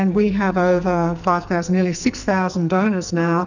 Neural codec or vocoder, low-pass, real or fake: codec, 44.1 kHz, 2.6 kbps, SNAC; 7.2 kHz; fake